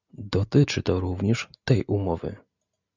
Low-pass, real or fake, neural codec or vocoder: 7.2 kHz; real; none